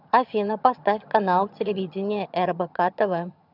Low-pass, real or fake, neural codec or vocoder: 5.4 kHz; fake; vocoder, 22.05 kHz, 80 mel bands, HiFi-GAN